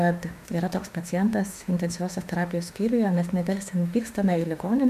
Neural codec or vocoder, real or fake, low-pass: autoencoder, 48 kHz, 32 numbers a frame, DAC-VAE, trained on Japanese speech; fake; 14.4 kHz